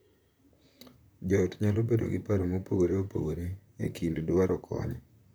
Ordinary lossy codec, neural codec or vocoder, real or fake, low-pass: none; vocoder, 44.1 kHz, 128 mel bands, Pupu-Vocoder; fake; none